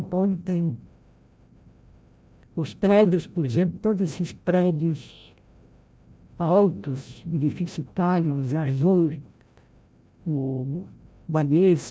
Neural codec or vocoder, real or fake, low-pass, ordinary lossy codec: codec, 16 kHz, 0.5 kbps, FreqCodec, larger model; fake; none; none